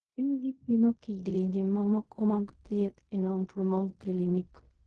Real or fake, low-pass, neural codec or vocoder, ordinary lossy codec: fake; 10.8 kHz; codec, 16 kHz in and 24 kHz out, 0.4 kbps, LongCat-Audio-Codec, fine tuned four codebook decoder; Opus, 16 kbps